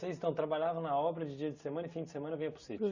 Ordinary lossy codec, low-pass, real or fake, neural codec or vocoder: Opus, 64 kbps; 7.2 kHz; real; none